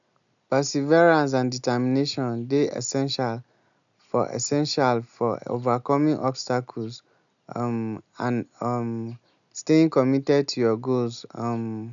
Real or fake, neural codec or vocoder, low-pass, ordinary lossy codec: real; none; 7.2 kHz; none